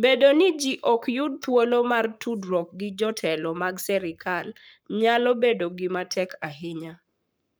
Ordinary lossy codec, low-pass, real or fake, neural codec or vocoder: none; none; fake; codec, 44.1 kHz, 7.8 kbps, Pupu-Codec